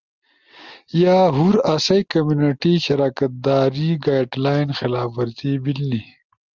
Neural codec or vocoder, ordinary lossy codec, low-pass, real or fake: none; Opus, 32 kbps; 7.2 kHz; real